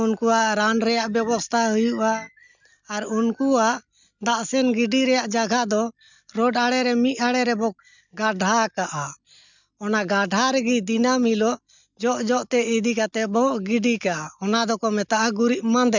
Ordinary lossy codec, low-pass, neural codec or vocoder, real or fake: none; 7.2 kHz; none; real